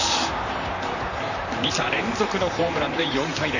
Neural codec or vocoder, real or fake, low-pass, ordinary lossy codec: vocoder, 44.1 kHz, 128 mel bands, Pupu-Vocoder; fake; 7.2 kHz; none